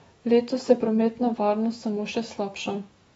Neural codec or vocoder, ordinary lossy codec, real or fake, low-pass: autoencoder, 48 kHz, 128 numbers a frame, DAC-VAE, trained on Japanese speech; AAC, 24 kbps; fake; 19.8 kHz